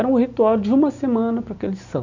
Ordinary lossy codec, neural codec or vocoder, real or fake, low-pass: none; none; real; 7.2 kHz